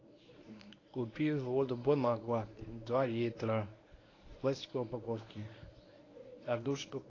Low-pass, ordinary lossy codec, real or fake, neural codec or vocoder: 7.2 kHz; AAC, 32 kbps; fake; codec, 24 kHz, 0.9 kbps, WavTokenizer, medium speech release version 1